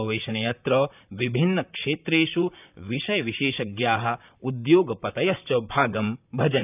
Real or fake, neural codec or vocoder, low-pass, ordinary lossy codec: fake; vocoder, 44.1 kHz, 128 mel bands, Pupu-Vocoder; 3.6 kHz; none